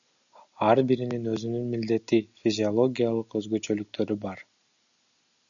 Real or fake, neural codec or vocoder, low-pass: real; none; 7.2 kHz